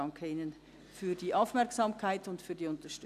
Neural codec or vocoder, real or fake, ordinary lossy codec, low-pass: none; real; none; 14.4 kHz